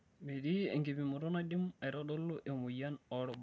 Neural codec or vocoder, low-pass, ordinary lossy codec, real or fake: none; none; none; real